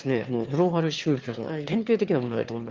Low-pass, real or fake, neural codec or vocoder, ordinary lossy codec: 7.2 kHz; fake; autoencoder, 22.05 kHz, a latent of 192 numbers a frame, VITS, trained on one speaker; Opus, 32 kbps